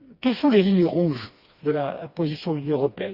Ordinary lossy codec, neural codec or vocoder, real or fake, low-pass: Opus, 64 kbps; codec, 16 kHz, 2 kbps, FreqCodec, smaller model; fake; 5.4 kHz